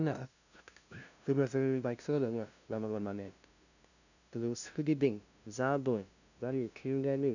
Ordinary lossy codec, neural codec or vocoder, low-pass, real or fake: none; codec, 16 kHz, 0.5 kbps, FunCodec, trained on LibriTTS, 25 frames a second; 7.2 kHz; fake